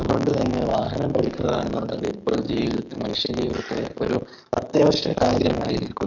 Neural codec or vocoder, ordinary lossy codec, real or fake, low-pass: codec, 44.1 kHz, 7.8 kbps, DAC; none; fake; 7.2 kHz